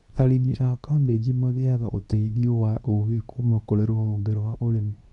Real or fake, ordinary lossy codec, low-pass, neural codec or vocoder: fake; none; 10.8 kHz; codec, 24 kHz, 0.9 kbps, WavTokenizer, medium speech release version 2